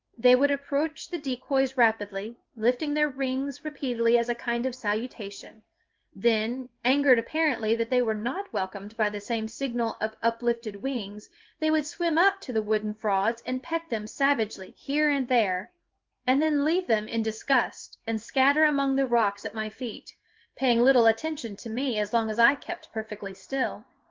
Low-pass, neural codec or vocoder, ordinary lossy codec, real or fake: 7.2 kHz; codec, 16 kHz in and 24 kHz out, 1 kbps, XY-Tokenizer; Opus, 32 kbps; fake